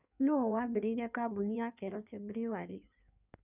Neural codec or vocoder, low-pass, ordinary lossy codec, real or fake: codec, 16 kHz in and 24 kHz out, 1.1 kbps, FireRedTTS-2 codec; 3.6 kHz; none; fake